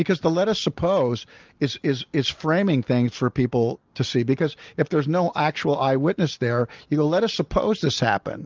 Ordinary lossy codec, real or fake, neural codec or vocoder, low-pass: Opus, 24 kbps; real; none; 7.2 kHz